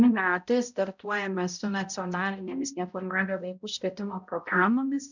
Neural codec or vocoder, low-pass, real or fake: codec, 16 kHz, 0.5 kbps, X-Codec, HuBERT features, trained on balanced general audio; 7.2 kHz; fake